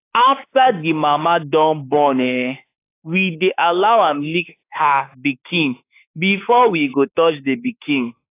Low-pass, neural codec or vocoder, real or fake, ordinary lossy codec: 3.6 kHz; autoencoder, 48 kHz, 32 numbers a frame, DAC-VAE, trained on Japanese speech; fake; AAC, 24 kbps